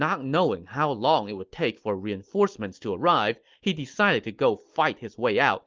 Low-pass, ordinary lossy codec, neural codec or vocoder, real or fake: 7.2 kHz; Opus, 32 kbps; none; real